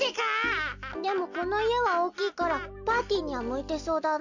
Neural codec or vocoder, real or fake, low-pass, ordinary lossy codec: none; real; 7.2 kHz; none